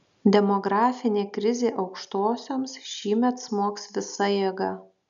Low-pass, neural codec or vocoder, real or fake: 7.2 kHz; none; real